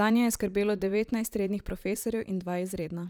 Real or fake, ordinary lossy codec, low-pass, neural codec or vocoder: fake; none; none; vocoder, 44.1 kHz, 128 mel bands, Pupu-Vocoder